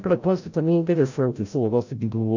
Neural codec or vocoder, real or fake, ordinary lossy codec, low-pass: codec, 16 kHz, 0.5 kbps, FreqCodec, larger model; fake; none; 7.2 kHz